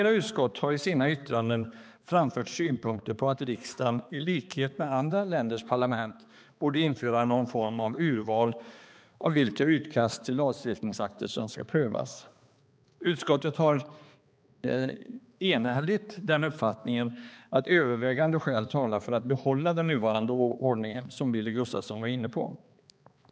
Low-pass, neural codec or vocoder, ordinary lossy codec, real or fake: none; codec, 16 kHz, 2 kbps, X-Codec, HuBERT features, trained on balanced general audio; none; fake